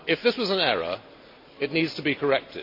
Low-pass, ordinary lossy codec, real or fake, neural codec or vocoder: 5.4 kHz; MP3, 48 kbps; real; none